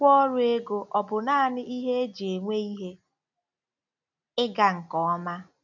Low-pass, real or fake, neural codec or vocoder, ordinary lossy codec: 7.2 kHz; real; none; none